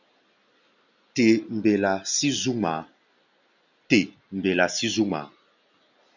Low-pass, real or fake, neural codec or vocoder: 7.2 kHz; real; none